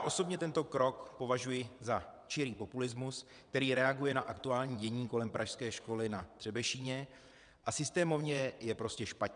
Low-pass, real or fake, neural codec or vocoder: 9.9 kHz; fake; vocoder, 22.05 kHz, 80 mel bands, WaveNeXt